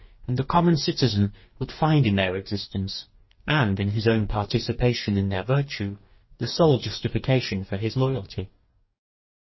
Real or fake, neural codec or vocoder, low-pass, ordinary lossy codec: fake; codec, 44.1 kHz, 2.6 kbps, DAC; 7.2 kHz; MP3, 24 kbps